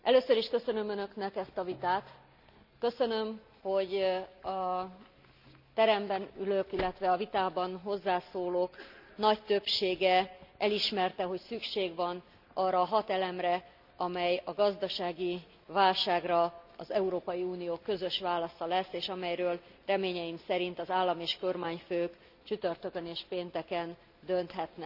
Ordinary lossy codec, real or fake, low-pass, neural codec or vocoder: none; real; 5.4 kHz; none